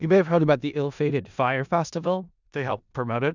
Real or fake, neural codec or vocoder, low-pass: fake; codec, 16 kHz in and 24 kHz out, 0.4 kbps, LongCat-Audio-Codec, four codebook decoder; 7.2 kHz